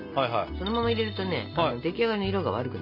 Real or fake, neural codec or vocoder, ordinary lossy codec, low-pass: real; none; none; 5.4 kHz